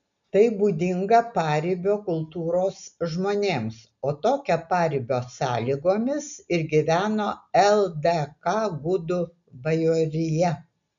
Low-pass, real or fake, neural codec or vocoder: 7.2 kHz; real; none